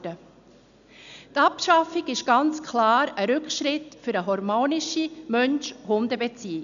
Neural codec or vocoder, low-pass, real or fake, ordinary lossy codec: none; 7.2 kHz; real; none